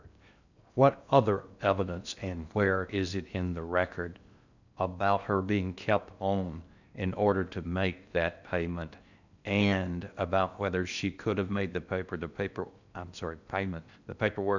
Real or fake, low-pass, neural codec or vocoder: fake; 7.2 kHz; codec, 16 kHz in and 24 kHz out, 0.6 kbps, FocalCodec, streaming, 4096 codes